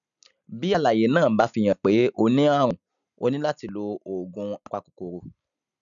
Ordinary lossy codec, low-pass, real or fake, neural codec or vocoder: none; 7.2 kHz; real; none